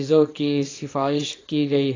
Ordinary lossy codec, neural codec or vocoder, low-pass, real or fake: AAC, 32 kbps; codec, 24 kHz, 0.9 kbps, WavTokenizer, small release; 7.2 kHz; fake